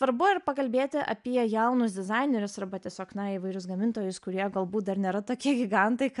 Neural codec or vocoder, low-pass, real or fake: none; 10.8 kHz; real